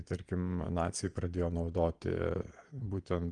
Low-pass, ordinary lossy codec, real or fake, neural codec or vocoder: 9.9 kHz; Opus, 24 kbps; fake; vocoder, 22.05 kHz, 80 mel bands, Vocos